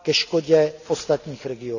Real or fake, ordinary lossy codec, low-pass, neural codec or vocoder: real; none; 7.2 kHz; none